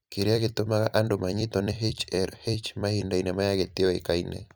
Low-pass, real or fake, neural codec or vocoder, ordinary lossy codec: none; fake; vocoder, 44.1 kHz, 128 mel bands every 256 samples, BigVGAN v2; none